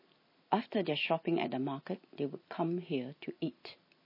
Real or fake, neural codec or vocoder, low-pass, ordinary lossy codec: real; none; 5.4 kHz; MP3, 24 kbps